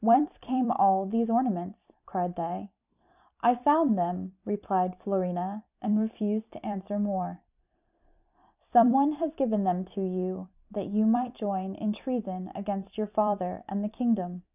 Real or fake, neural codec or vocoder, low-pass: fake; vocoder, 44.1 kHz, 128 mel bands every 256 samples, BigVGAN v2; 3.6 kHz